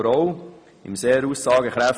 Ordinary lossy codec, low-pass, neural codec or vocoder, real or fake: none; none; none; real